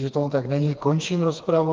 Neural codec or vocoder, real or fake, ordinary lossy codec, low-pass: codec, 16 kHz, 2 kbps, FreqCodec, smaller model; fake; Opus, 32 kbps; 7.2 kHz